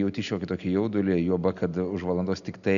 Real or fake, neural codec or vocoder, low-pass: real; none; 7.2 kHz